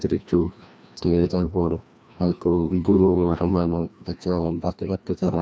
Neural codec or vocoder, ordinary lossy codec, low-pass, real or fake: codec, 16 kHz, 1 kbps, FreqCodec, larger model; none; none; fake